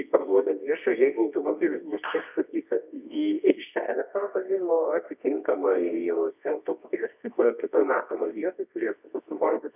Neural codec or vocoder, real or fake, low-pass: codec, 24 kHz, 0.9 kbps, WavTokenizer, medium music audio release; fake; 3.6 kHz